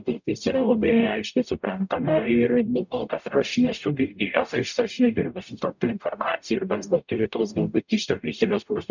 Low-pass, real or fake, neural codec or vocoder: 7.2 kHz; fake; codec, 44.1 kHz, 0.9 kbps, DAC